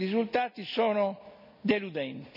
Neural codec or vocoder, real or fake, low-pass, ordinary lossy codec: none; real; 5.4 kHz; none